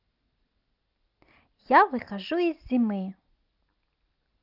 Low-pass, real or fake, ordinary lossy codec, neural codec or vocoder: 5.4 kHz; real; Opus, 24 kbps; none